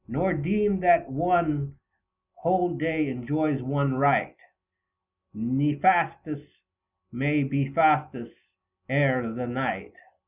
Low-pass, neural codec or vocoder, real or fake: 3.6 kHz; none; real